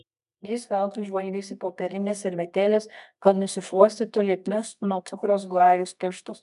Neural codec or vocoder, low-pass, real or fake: codec, 24 kHz, 0.9 kbps, WavTokenizer, medium music audio release; 10.8 kHz; fake